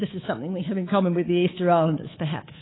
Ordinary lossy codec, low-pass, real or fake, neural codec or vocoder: AAC, 16 kbps; 7.2 kHz; fake; codec, 24 kHz, 3.1 kbps, DualCodec